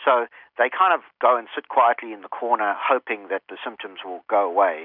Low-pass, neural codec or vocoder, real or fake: 5.4 kHz; none; real